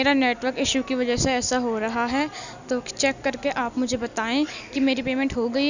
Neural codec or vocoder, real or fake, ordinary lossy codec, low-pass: none; real; none; 7.2 kHz